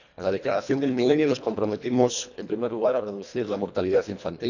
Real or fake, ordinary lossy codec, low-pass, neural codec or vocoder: fake; none; 7.2 kHz; codec, 24 kHz, 1.5 kbps, HILCodec